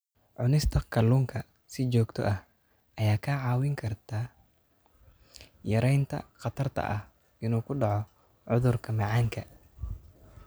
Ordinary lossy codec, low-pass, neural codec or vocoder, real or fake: none; none; none; real